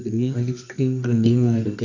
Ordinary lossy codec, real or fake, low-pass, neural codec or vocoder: MP3, 64 kbps; fake; 7.2 kHz; codec, 24 kHz, 0.9 kbps, WavTokenizer, medium music audio release